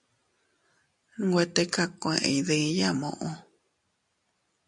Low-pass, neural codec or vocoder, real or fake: 10.8 kHz; none; real